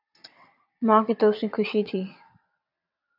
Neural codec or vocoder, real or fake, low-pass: vocoder, 22.05 kHz, 80 mel bands, WaveNeXt; fake; 5.4 kHz